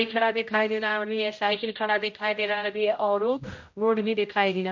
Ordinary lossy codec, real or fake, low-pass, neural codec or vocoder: MP3, 48 kbps; fake; 7.2 kHz; codec, 16 kHz, 0.5 kbps, X-Codec, HuBERT features, trained on general audio